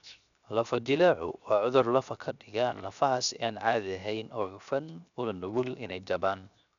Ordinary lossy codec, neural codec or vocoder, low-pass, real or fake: none; codec, 16 kHz, 0.7 kbps, FocalCodec; 7.2 kHz; fake